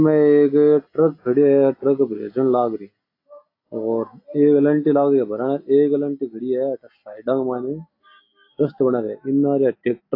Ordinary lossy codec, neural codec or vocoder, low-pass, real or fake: AAC, 32 kbps; none; 5.4 kHz; real